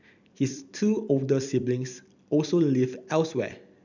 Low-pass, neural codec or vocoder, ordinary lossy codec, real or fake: 7.2 kHz; vocoder, 44.1 kHz, 128 mel bands every 256 samples, BigVGAN v2; none; fake